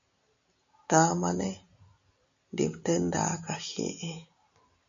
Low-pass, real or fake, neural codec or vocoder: 7.2 kHz; real; none